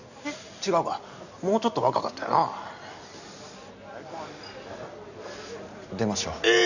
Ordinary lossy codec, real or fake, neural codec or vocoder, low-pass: none; real; none; 7.2 kHz